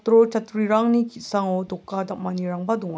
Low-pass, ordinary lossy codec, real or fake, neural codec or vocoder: none; none; real; none